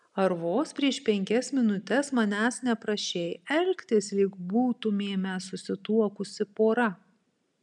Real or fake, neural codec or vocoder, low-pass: real; none; 10.8 kHz